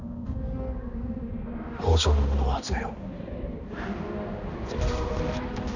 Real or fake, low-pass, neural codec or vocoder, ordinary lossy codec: fake; 7.2 kHz; codec, 16 kHz, 2 kbps, X-Codec, HuBERT features, trained on balanced general audio; none